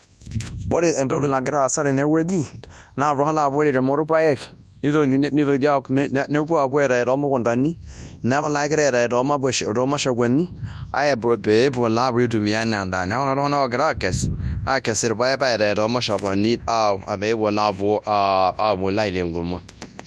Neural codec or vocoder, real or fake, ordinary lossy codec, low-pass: codec, 24 kHz, 0.9 kbps, WavTokenizer, large speech release; fake; none; none